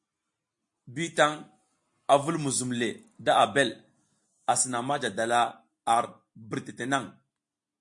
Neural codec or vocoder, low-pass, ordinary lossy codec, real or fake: none; 10.8 kHz; MP3, 48 kbps; real